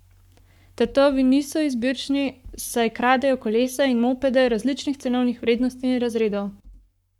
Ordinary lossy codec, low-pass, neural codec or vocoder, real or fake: none; 19.8 kHz; codec, 44.1 kHz, 7.8 kbps, Pupu-Codec; fake